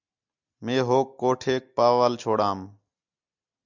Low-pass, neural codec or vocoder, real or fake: 7.2 kHz; none; real